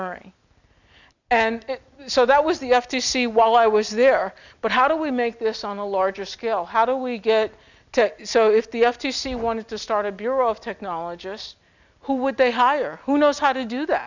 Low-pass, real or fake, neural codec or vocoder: 7.2 kHz; real; none